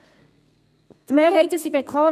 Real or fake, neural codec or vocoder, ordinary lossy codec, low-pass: fake; codec, 32 kHz, 1.9 kbps, SNAC; none; 14.4 kHz